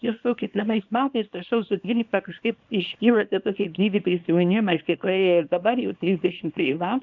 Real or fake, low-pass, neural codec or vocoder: fake; 7.2 kHz; codec, 24 kHz, 0.9 kbps, WavTokenizer, small release